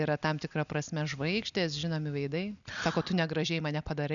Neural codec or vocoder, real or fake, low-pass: none; real; 7.2 kHz